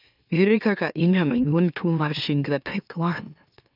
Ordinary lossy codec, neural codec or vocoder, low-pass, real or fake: none; autoencoder, 44.1 kHz, a latent of 192 numbers a frame, MeloTTS; 5.4 kHz; fake